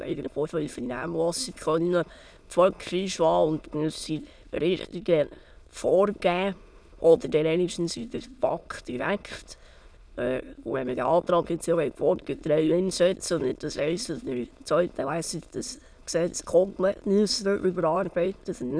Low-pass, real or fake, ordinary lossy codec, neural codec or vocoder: none; fake; none; autoencoder, 22.05 kHz, a latent of 192 numbers a frame, VITS, trained on many speakers